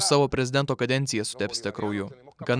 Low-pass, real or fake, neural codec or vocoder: 9.9 kHz; real; none